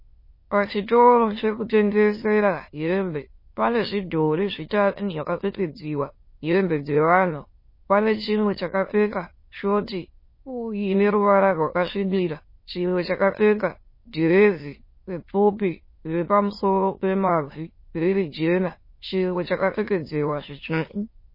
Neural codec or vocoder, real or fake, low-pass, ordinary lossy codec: autoencoder, 22.05 kHz, a latent of 192 numbers a frame, VITS, trained on many speakers; fake; 5.4 kHz; MP3, 24 kbps